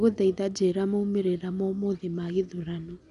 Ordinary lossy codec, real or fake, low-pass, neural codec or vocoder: none; real; 10.8 kHz; none